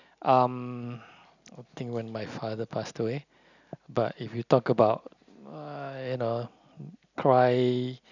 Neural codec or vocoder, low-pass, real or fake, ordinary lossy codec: none; 7.2 kHz; real; none